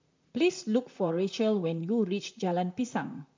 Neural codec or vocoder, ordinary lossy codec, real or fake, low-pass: vocoder, 44.1 kHz, 128 mel bands, Pupu-Vocoder; MP3, 64 kbps; fake; 7.2 kHz